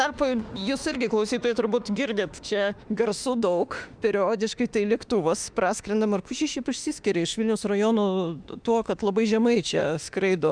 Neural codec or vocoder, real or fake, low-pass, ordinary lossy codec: autoencoder, 48 kHz, 32 numbers a frame, DAC-VAE, trained on Japanese speech; fake; 9.9 kHz; Opus, 64 kbps